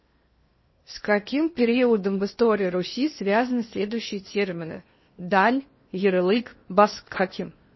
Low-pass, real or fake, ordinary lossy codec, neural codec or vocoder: 7.2 kHz; fake; MP3, 24 kbps; codec, 16 kHz in and 24 kHz out, 0.8 kbps, FocalCodec, streaming, 65536 codes